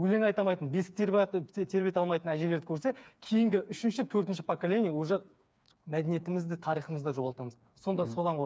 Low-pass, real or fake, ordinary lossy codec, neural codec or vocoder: none; fake; none; codec, 16 kHz, 4 kbps, FreqCodec, smaller model